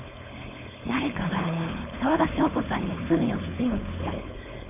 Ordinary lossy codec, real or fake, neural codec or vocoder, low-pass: MP3, 32 kbps; fake; codec, 16 kHz, 4.8 kbps, FACodec; 3.6 kHz